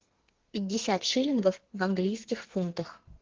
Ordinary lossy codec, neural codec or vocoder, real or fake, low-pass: Opus, 16 kbps; codec, 32 kHz, 1.9 kbps, SNAC; fake; 7.2 kHz